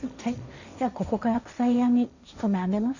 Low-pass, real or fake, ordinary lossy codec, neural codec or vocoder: 7.2 kHz; fake; none; codec, 16 kHz, 1.1 kbps, Voila-Tokenizer